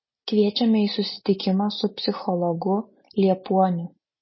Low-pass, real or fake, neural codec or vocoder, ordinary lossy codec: 7.2 kHz; real; none; MP3, 24 kbps